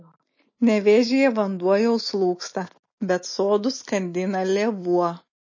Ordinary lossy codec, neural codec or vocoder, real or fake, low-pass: MP3, 32 kbps; none; real; 7.2 kHz